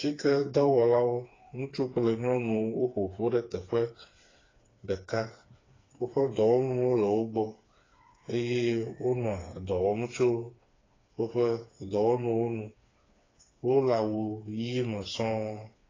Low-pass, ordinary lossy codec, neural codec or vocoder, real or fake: 7.2 kHz; AAC, 32 kbps; codec, 16 kHz, 4 kbps, FreqCodec, smaller model; fake